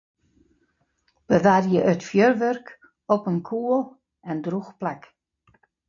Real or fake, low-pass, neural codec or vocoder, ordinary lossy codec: real; 7.2 kHz; none; AAC, 48 kbps